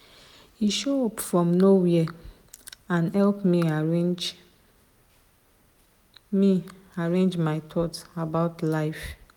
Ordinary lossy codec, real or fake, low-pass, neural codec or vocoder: none; real; 19.8 kHz; none